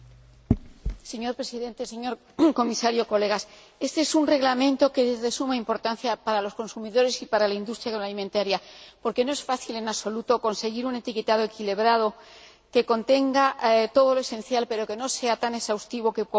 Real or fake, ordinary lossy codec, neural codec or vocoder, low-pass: real; none; none; none